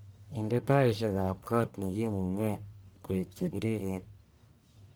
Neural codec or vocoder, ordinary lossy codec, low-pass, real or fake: codec, 44.1 kHz, 1.7 kbps, Pupu-Codec; none; none; fake